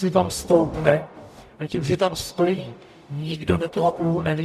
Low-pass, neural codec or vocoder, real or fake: 14.4 kHz; codec, 44.1 kHz, 0.9 kbps, DAC; fake